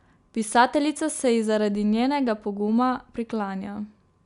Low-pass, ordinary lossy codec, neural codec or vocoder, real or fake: 10.8 kHz; none; none; real